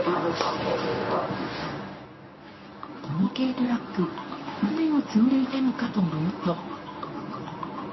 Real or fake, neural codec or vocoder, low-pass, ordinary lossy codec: fake; codec, 24 kHz, 0.9 kbps, WavTokenizer, medium speech release version 1; 7.2 kHz; MP3, 24 kbps